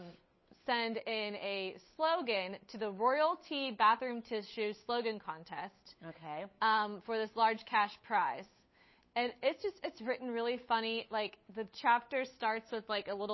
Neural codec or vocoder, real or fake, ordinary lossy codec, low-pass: codec, 16 kHz, 8 kbps, FunCodec, trained on Chinese and English, 25 frames a second; fake; MP3, 24 kbps; 7.2 kHz